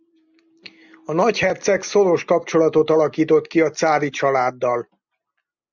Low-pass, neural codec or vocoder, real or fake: 7.2 kHz; none; real